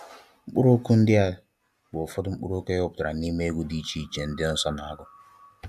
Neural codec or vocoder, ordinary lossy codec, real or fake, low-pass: none; AAC, 96 kbps; real; 14.4 kHz